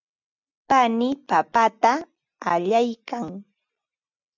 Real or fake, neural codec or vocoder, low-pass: real; none; 7.2 kHz